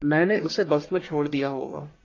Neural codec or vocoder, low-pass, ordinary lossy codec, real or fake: codec, 44.1 kHz, 1.7 kbps, Pupu-Codec; 7.2 kHz; AAC, 32 kbps; fake